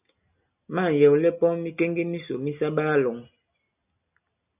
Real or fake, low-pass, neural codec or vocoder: real; 3.6 kHz; none